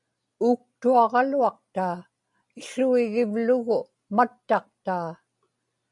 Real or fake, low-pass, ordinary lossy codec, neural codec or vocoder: real; 10.8 kHz; MP3, 96 kbps; none